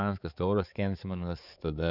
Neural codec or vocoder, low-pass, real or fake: codec, 16 kHz, 4 kbps, X-Codec, HuBERT features, trained on balanced general audio; 5.4 kHz; fake